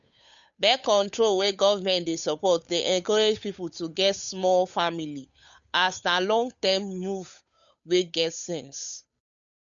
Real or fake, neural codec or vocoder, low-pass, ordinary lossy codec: fake; codec, 16 kHz, 8 kbps, FunCodec, trained on Chinese and English, 25 frames a second; 7.2 kHz; none